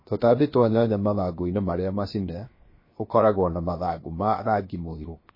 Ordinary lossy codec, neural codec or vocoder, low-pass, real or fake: MP3, 24 kbps; codec, 16 kHz, 0.7 kbps, FocalCodec; 5.4 kHz; fake